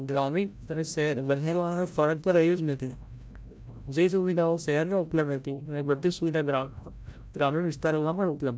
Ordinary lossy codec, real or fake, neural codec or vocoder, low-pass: none; fake; codec, 16 kHz, 0.5 kbps, FreqCodec, larger model; none